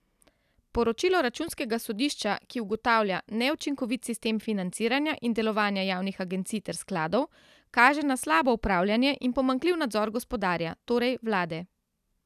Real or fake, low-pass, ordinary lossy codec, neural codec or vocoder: real; 14.4 kHz; none; none